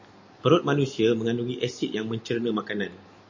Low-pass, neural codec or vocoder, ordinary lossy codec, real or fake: 7.2 kHz; none; MP3, 32 kbps; real